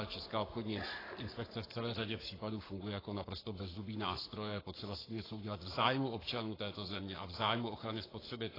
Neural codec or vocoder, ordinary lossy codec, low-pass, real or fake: codec, 44.1 kHz, 7.8 kbps, Pupu-Codec; AAC, 24 kbps; 5.4 kHz; fake